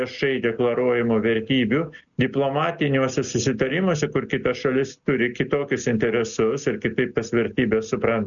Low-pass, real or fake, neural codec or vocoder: 7.2 kHz; real; none